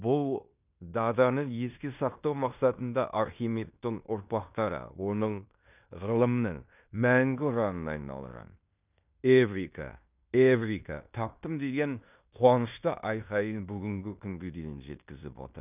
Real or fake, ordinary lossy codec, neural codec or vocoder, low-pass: fake; none; codec, 16 kHz in and 24 kHz out, 0.9 kbps, LongCat-Audio-Codec, fine tuned four codebook decoder; 3.6 kHz